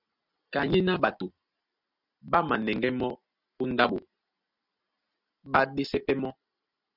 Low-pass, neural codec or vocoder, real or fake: 5.4 kHz; none; real